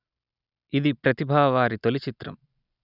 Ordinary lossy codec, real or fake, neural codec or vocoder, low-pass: none; real; none; 5.4 kHz